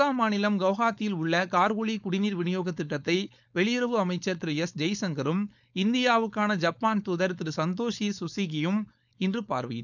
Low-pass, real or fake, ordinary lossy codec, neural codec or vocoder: 7.2 kHz; fake; none; codec, 16 kHz, 4.8 kbps, FACodec